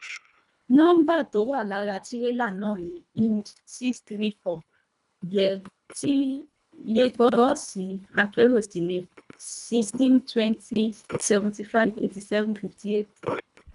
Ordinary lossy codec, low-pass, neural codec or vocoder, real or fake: none; 10.8 kHz; codec, 24 kHz, 1.5 kbps, HILCodec; fake